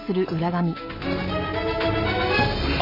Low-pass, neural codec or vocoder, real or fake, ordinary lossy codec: 5.4 kHz; none; real; none